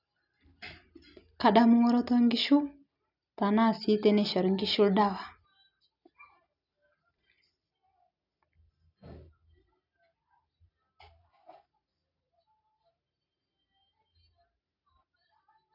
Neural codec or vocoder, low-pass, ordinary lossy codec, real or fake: none; 5.4 kHz; none; real